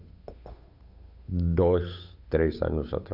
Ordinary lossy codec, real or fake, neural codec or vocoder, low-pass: none; fake; codec, 16 kHz, 8 kbps, FunCodec, trained on Chinese and English, 25 frames a second; 5.4 kHz